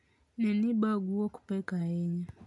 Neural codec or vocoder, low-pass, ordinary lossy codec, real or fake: none; 10.8 kHz; none; real